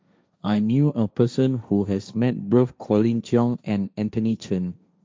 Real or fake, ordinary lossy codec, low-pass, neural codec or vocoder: fake; none; none; codec, 16 kHz, 1.1 kbps, Voila-Tokenizer